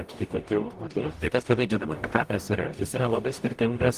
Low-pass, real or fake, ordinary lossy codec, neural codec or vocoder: 14.4 kHz; fake; Opus, 24 kbps; codec, 44.1 kHz, 0.9 kbps, DAC